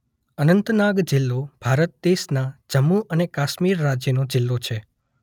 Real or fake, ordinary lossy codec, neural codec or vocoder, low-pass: real; none; none; 19.8 kHz